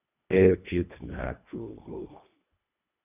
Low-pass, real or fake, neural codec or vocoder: 3.6 kHz; fake; codec, 24 kHz, 1.5 kbps, HILCodec